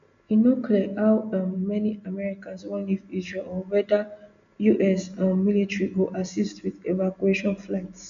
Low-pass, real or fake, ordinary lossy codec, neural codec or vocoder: 7.2 kHz; real; none; none